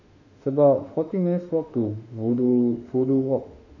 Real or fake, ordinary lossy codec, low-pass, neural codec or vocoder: fake; none; 7.2 kHz; autoencoder, 48 kHz, 32 numbers a frame, DAC-VAE, trained on Japanese speech